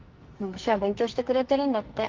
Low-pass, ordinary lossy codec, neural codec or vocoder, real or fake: 7.2 kHz; Opus, 32 kbps; codec, 44.1 kHz, 2.6 kbps, SNAC; fake